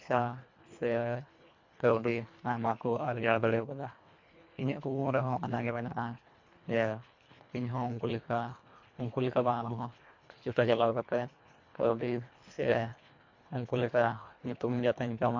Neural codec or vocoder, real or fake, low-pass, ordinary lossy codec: codec, 24 kHz, 1.5 kbps, HILCodec; fake; 7.2 kHz; MP3, 48 kbps